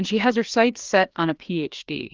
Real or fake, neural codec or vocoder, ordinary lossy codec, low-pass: fake; codec, 16 kHz, 2 kbps, FreqCodec, larger model; Opus, 24 kbps; 7.2 kHz